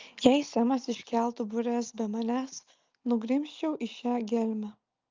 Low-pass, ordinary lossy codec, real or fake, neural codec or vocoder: 7.2 kHz; Opus, 24 kbps; real; none